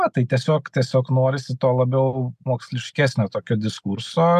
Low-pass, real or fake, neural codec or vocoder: 14.4 kHz; real; none